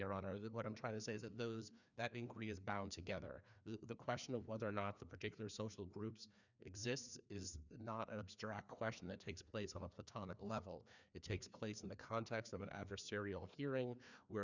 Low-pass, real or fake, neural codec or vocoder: 7.2 kHz; fake; codec, 16 kHz, 2 kbps, FreqCodec, larger model